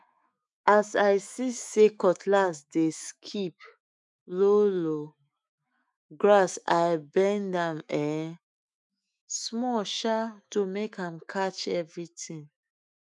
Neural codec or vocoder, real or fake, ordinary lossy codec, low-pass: autoencoder, 48 kHz, 128 numbers a frame, DAC-VAE, trained on Japanese speech; fake; none; 10.8 kHz